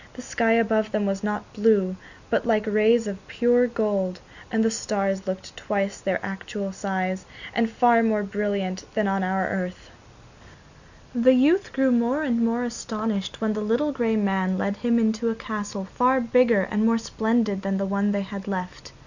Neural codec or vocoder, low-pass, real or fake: none; 7.2 kHz; real